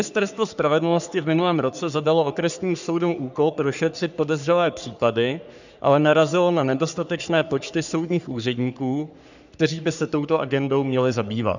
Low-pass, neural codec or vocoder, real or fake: 7.2 kHz; codec, 44.1 kHz, 3.4 kbps, Pupu-Codec; fake